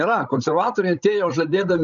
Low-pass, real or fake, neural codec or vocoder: 7.2 kHz; fake; codec, 16 kHz, 16 kbps, FreqCodec, larger model